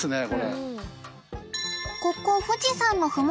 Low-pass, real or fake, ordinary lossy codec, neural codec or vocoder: none; real; none; none